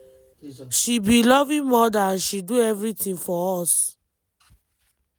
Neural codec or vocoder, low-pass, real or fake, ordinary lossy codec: none; none; real; none